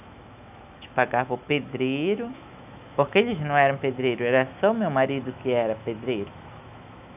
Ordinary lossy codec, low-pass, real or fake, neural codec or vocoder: none; 3.6 kHz; fake; autoencoder, 48 kHz, 128 numbers a frame, DAC-VAE, trained on Japanese speech